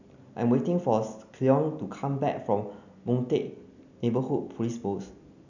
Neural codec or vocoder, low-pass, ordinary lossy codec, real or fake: none; 7.2 kHz; none; real